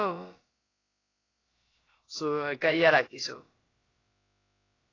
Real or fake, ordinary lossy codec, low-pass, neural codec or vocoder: fake; AAC, 32 kbps; 7.2 kHz; codec, 16 kHz, about 1 kbps, DyCAST, with the encoder's durations